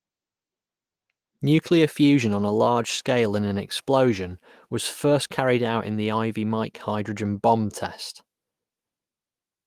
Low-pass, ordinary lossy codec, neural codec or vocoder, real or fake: 14.4 kHz; Opus, 24 kbps; autoencoder, 48 kHz, 128 numbers a frame, DAC-VAE, trained on Japanese speech; fake